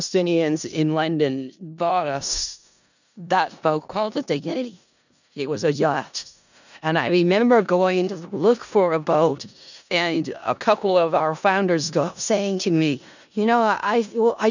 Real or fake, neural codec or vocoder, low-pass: fake; codec, 16 kHz in and 24 kHz out, 0.4 kbps, LongCat-Audio-Codec, four codebook decoder; 7.2 kHz